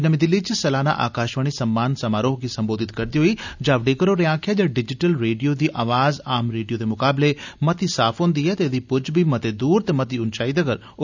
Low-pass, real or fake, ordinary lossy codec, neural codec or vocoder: 7.2 kHz; real; none; none